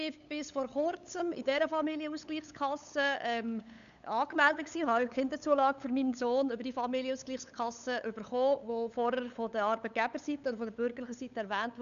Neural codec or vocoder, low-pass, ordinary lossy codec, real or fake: codec, 16 kHz, 8 kbps, FunCodec, trained on LibriTTS, 25 frames a second; 7.2 kHz; MP3, 96 kbps; fake